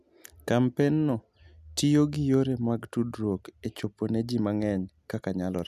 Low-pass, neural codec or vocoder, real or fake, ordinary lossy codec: 14.4 kHz; none; real; none